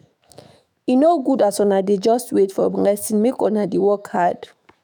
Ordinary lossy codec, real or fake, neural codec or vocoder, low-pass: none; fake; autoencoder, 48 kHz, 128 numbers a frame, DAC-VAE, trained on Japanese speech; none